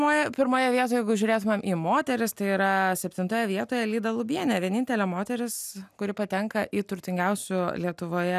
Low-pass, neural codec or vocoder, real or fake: 14.4 kHz; none; real